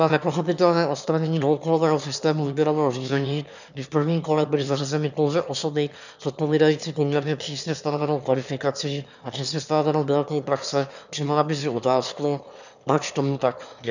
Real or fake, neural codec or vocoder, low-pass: fake; autoencoder, 22.05 kHz, a latent of 192 numbers a frame, VITS, trained on one speaker; 7.2 kHz